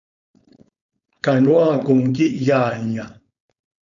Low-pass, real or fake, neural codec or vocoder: 7.2 kHz; fake; codec, 16 kHz, 4.8 kbps, FACodec